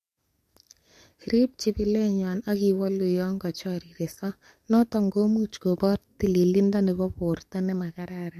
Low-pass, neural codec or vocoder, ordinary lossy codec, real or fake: 14.4 kHz; codec, 44.1 kHz, 7.8 kbps, DAC; MP3, 64 kbps; fake